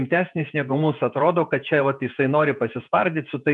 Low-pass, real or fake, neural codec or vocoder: 10.8 kHz; fake; vocoder, 48 kHz, 128 mel bands, Vocos